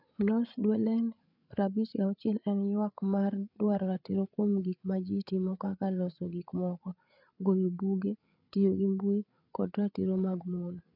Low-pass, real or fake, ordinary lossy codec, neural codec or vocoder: 5.4 kHz; fake; none; codec, 16 kHz, 8 kbps, FreqCodec, larger model